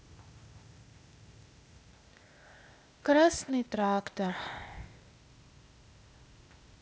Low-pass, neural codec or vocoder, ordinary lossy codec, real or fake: none; codec, 16 kHz, 0.8 kbps, ZipCodec; none; fake